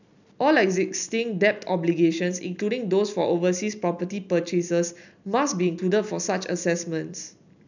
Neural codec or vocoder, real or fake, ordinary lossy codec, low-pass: none; real; none; 7.2 kHz